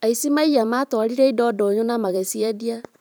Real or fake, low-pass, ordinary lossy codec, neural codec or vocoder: fake; none; none; vocoder, 44.1 kHz, 128 mel bands every 512 samples, BigVGAN v2